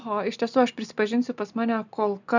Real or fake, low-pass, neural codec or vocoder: real; 7.2 kHz; none